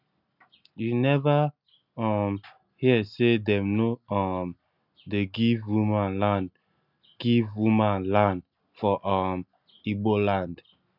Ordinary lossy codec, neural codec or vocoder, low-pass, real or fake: none; none; 5.4 kHz; real